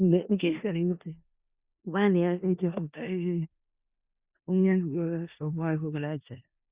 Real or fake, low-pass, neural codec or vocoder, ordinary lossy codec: fake; 3.6 kHz; codec, 16 kHz in and 24 kHz out, 0.4 kbps, LongCat-Audio-Codec, four codebook decoder; Opus, 64 kbps